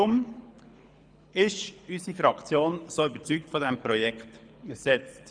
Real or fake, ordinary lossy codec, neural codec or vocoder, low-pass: fake; none; codec, 24 kHz, 6 kbps, HILCodec; 9.9 kHz